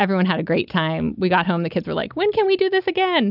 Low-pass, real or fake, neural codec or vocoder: 5.4 kHz; real; none